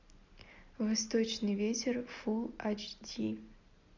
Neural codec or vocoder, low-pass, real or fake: none; 7.2 kHz; real